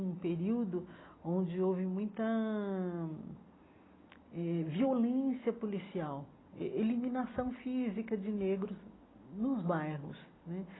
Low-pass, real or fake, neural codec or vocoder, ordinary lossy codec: 7.2 kHz; real; none; AAC, 16 kbps